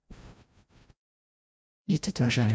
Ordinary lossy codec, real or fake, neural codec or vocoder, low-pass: none; fake; codec, 16 kHz, 0.5 kbps, FreqCodec, larger model; none